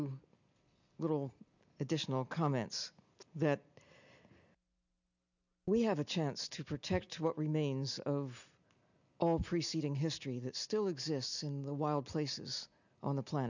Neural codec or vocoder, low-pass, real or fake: none; 7.2 kHz; real